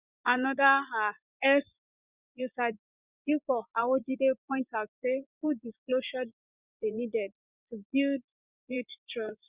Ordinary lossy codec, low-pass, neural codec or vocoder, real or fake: Opus, 64 kbps; 3.6 kHz; vocoder, 24 kHz, 100 mel bands, Vocos; fake